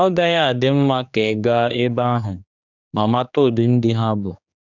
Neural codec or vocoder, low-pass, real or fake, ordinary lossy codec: codec, 16 kHz, 2 kbps, X-Codec, HuBERT features, trained on general audio; 7.2 kHz; fake; none